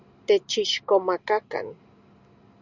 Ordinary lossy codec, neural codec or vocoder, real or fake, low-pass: Opus, 64 kbps; none; real; 7.2 kHz